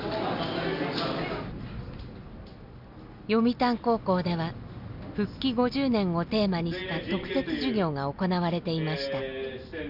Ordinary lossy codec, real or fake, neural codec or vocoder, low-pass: none; real; none; 5.4 kHz